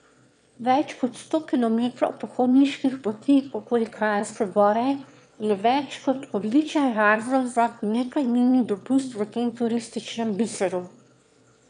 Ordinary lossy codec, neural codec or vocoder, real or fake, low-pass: none; autoencoder, 22.05 kHz, a latent of 192 numbers a frame, VITS, trained on one speaker; fake; 9.9 kHz